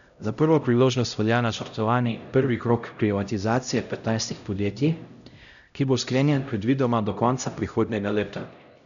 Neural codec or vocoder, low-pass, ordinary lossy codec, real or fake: codec, 16 kHz, 0.5 kbps, X-Codec, HuBERT features, trained on LibriSpeech; 7.2 kHz; none; fake